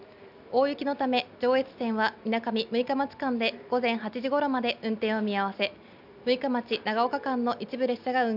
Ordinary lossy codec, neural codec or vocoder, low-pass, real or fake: none; none; 5.4 kHz; real